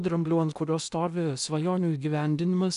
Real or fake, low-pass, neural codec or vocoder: fake; 10.8 kHz; codec, 16 kHz in and 24 kHz out, 0.8 kbps, FocalCodec, streaming, 65536 codes